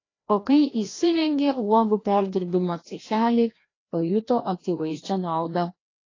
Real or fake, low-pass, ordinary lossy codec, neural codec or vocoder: fake; 7.2 kHz; AAC, 32 kbps; codec, 16 kHz, 1 kbps, FreqCodec, larger model